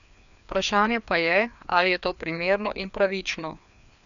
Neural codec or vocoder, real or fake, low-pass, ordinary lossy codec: codec, 16 kHz, 2 kbps, FreqCodec, larger model; fake; 7.2 kHz; none